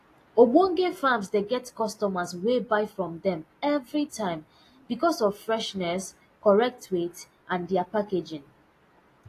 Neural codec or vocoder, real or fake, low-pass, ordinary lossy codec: none; real; 14.4 kHz; AAC, 48 kbps